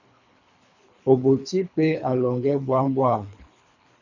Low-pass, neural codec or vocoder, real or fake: 7.2 kHz; codec, 24 kHz, 3 kbps, HILCodec; fake